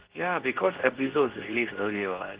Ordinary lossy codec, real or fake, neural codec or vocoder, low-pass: Opus, 16 kbps; fake; codec, 24 kHz, 0.9 kbps, WavTokenizer, medium speech release version 2; 3.6 kHz